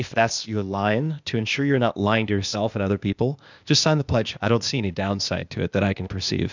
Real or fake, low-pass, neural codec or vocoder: fake; 7.2 kHz; codec, 16 kHz, 0.8 kbps, ZipCodec